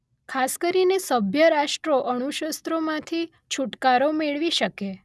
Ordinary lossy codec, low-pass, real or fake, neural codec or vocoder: none; none; real; none